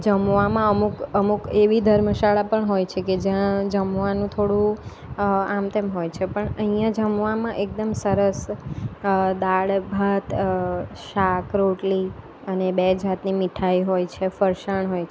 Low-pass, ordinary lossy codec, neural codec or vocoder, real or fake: none; none; none; real